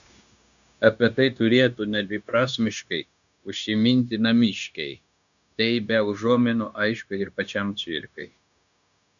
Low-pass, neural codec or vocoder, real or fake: 7.2 kHz; codec, 16 kHz, 0.9 kbps, LongCat-Audio-Codec; fake